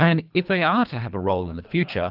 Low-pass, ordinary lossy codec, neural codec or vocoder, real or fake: 5.4 kHz; Opus, 32 kbps; codec, 24 kHz, 3 kbps, HILCodec; fake